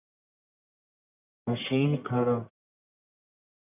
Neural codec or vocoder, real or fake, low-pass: codec, 44.1 kHz, 1.7 kbps, Pupu-Codec; fake; 3.6 kHz